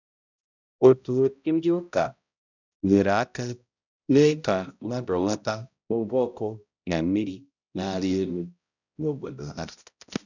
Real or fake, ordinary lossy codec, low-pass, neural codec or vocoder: fake; none; 7.2 kHz; codec, 16 kHz, 0.5 kbps, X-Codec, HuBERT features, trained on balanced general audio